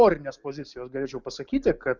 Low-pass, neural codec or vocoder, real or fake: 7.2 kHz; none; real